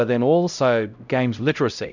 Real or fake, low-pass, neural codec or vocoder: fake; 7.2 kHz; codec, 16 kHz, 0.5 kbps, X-Codec, HuBERT features, trained on LibriSpeech